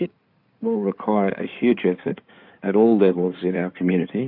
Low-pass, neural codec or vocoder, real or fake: 5.4 kHz; codec, 16 kHz in and 24 kHz out, 2.2 kbps, FireRedTTS-2 codec; fake